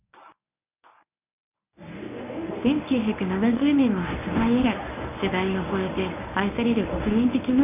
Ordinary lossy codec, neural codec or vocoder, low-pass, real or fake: none; codec, 24 kHz, 0.9 kbps, WavTokenizer, medium speech release version 1; 3.6 kHz; fake